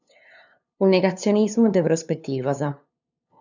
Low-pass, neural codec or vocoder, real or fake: 7.2 kHz; codec, 16 kHz, 2 kbps, FunCodec, trained on LibriTTS, 25 frames a second; fake